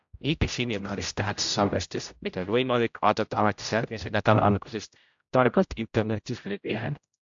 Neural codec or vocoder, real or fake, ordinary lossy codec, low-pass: codec, 16 kHz, 0.5 kbps, X-Codec, HuBERT features, trained on general audio; fake; AAC, 64 kbps; 7.2 kHz